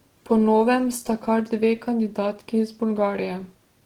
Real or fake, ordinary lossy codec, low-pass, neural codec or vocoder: real; Opus, 16 kbps; 19.8 kHz; none